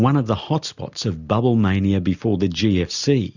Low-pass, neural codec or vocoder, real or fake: 7.2 kHz; none; real